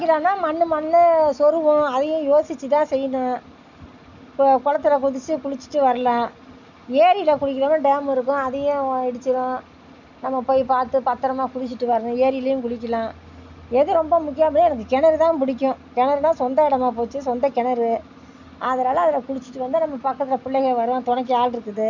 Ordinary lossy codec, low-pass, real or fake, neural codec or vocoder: none; 7.2 kHz; real; none